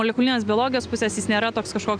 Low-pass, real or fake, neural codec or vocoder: 9.9 kHz; real; none